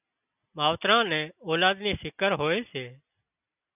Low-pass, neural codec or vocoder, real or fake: 3.6 kHz; none; real